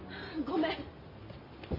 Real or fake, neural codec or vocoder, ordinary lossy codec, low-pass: real; none; MP3, 24 kbps; 5.4 kHz